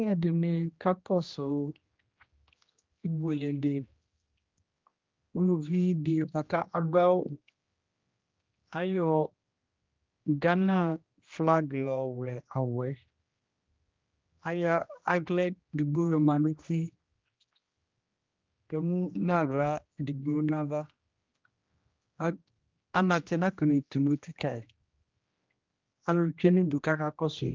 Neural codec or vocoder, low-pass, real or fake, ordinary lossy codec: codec, 16 kHz, 1 kbps, X-Codec, HuBERT features, trained on general audio; 7.2 kHz; fake; Opus, 32 kbps